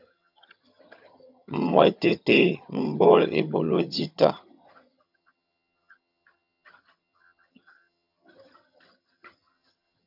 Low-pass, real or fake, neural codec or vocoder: 5.4 kHz; fake; vocoder, 22.05 kHz, 80 mel bands, HiFi-GAN